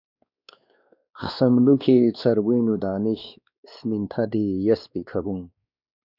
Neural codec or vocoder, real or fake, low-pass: codec, 16 kHz, 2 kbps, X-Codec, WavLM features, trained on Multilingual LibriSpeech; fake; 5.4 kHz